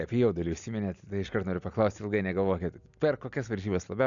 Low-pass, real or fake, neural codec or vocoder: 7.2 kHz; real; none